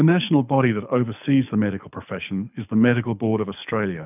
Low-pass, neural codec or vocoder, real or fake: 3.6 kHz; codec, 24 kHz, 6 kbps, HILCodec; fake